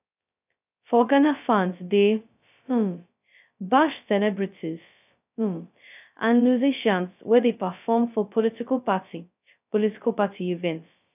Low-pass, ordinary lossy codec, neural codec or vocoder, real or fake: 3.6 kHz; none; codec, 16 kHz, 0.2 kbps, FocalCodec; fake